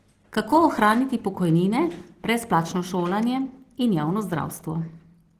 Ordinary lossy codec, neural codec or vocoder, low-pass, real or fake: Opus, 16 kbps; none; 14.4 kHz; real